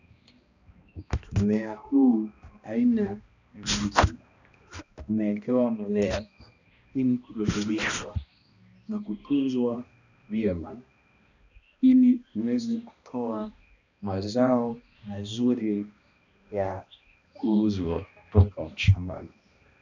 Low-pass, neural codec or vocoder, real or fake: 7.2 kHz; codec, 16 kHz, 1 kbps, X-Codec, HuBERT features, trained on balanced general audio; fake